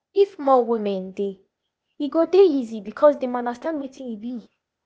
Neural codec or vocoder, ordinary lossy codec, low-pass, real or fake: codec, 16 kHz, 0.8 kbps, ZipCodec; none; none; fake